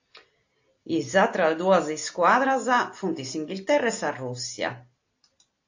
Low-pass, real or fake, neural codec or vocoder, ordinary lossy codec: 7.2 kHz; real; none; AAC, 48 kbps